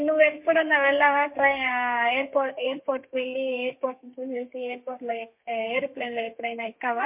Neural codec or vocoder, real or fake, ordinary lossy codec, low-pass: codec, 32 kHz, 1.9 kbps, SNAC; fake; MP3, 32 kbps; 3.6 kHz